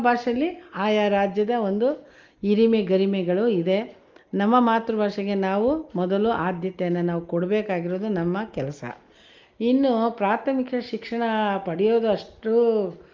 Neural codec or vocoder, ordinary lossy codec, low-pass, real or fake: none; Opus, 32 kbps; 7.2 kHz; real